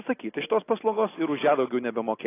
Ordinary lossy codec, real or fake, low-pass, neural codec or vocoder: AAC, 16 kbps; real; 3.6 kHz; none